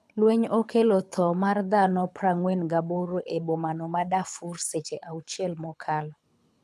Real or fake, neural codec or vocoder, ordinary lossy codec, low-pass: fake; codec, 24 kHz, 6 kbps, HILCodec; none; none